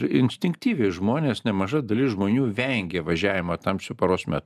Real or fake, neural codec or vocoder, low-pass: real; none; 14.4 kHz